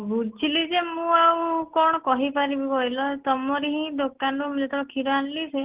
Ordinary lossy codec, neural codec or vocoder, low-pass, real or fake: Opus, 16 kbps; none; 3.6 kHz; real